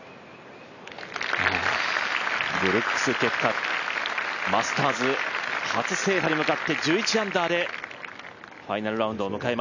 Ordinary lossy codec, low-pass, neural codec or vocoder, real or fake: none; 7.2 kHz; none; real